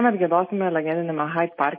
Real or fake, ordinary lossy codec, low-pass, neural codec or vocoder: real; MP3, 24 kbps; 5.4 kHz; none